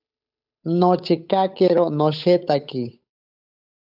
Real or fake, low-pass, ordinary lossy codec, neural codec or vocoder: fake; 5.4 kHz; AAC, 48 kbps; codec, 16 kHz, 8 kbps, FunCodec, trained on Chinese and English, 25 frames a second